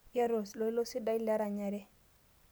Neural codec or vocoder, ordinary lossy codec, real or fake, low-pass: none; none; real; none